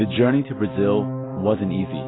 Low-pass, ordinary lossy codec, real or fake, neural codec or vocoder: 7.2 kHz; AAC, 16 kbps; real; none